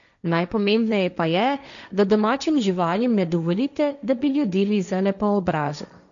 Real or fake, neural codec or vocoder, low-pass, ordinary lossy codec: fake; codec, 16 kHz, 1.1 kbps, Voila-Tokenizer; 7.2 kHz; none